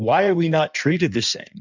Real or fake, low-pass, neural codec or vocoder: fake; 7.2 kHz; codec, 16 kHz in and 24 kHz out, 1.1 kbps, FireRedTTS-2 codec